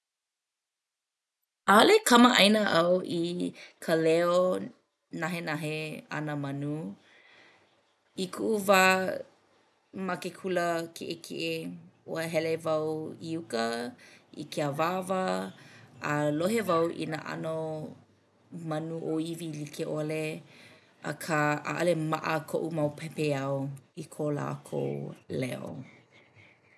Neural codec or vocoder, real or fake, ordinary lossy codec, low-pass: none; real; none; none